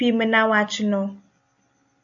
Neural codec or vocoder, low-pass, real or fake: none; 7.2 kHz; real